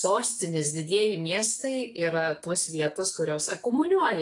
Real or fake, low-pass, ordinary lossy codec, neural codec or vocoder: fake; 10.8 kHz; AAC, 48 kbps; codec, 44.1 kHz, 2.6 kbps, SNAC